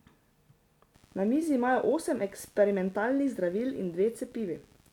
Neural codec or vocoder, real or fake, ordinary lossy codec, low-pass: none; real; none; 19.8 kHz